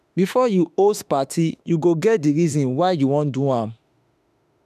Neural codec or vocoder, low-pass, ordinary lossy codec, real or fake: autoencoder, 48 kHz, 32 numbers a frame, DAC-VAE, trained on Japanese speech; 14.4 kHz; none; fake